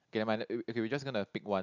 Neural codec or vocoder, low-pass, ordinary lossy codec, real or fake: none; 7.2 kHz; none; real